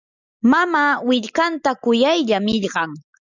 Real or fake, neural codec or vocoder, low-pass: real; none; 7.2 kHz